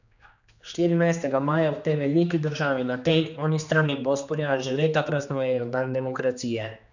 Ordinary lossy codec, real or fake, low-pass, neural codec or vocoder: none; fake; 7.2 kHz; codec, 16 kHz, 2 kbps, X-Codec, HuBERT features, trained on general audio